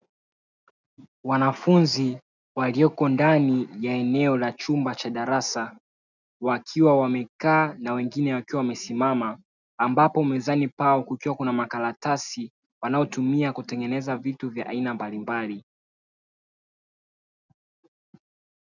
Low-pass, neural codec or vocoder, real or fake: 7.2 kHz; none; real